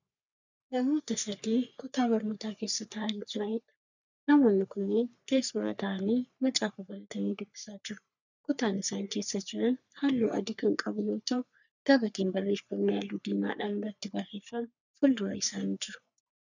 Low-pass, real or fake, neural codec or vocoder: 7.2 kHz; fake; codec, 44.1 kHz, 3.4 kbps, Pupu-Codec